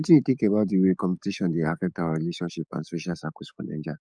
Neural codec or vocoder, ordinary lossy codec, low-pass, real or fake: codec, 44.1 kHz, 7.8 kbps, DAC; none; 9.9 kHz; fake